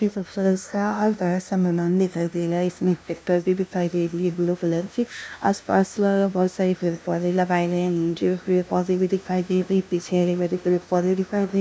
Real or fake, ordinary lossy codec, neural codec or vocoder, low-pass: fake; none; codec, 16 kHz, 0.5 kbps, FunCodec, trained on LibriTTS, 25 frames a second; none